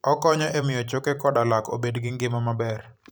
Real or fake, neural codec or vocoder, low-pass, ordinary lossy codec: fake; vocoder, 44.1 kHz, 128 mel bands every 512 samples, BigVGAN v2; none; none